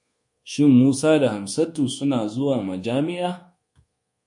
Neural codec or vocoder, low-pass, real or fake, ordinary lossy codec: codec, 24 kHz, 1.2 kbps, DualCodec; 10.8 kHz; fake; MP3, 48 kbps